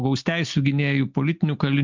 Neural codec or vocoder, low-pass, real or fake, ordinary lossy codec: none; 7.2 kHz; real; MP3, 64 kbps